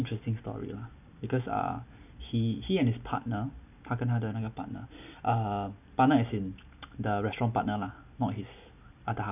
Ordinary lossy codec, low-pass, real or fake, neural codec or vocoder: none; 3.6 kHz; real; none